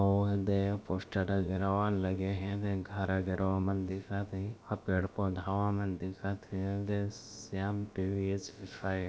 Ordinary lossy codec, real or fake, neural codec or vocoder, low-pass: none; fake; codec, 16 kHz, about 1 kbps, DyCAST, with the encoder's durations; none